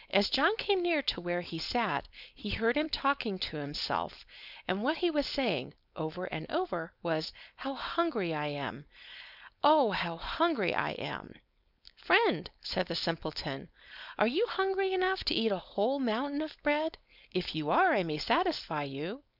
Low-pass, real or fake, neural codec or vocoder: 5.4 kHz; fake; codec, 16 kHz, 4.8 kbps, FACodec